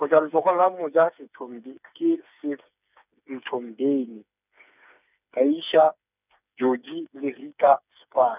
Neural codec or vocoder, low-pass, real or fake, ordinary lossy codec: codec, 16 kHz, 4 kbps, FreqCodec, smaller model; 3.6 kHz; fake; none